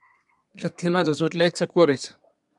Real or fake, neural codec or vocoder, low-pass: fake; codec, 24 kHz, 1 kbps, SNAC; 10.8 kHz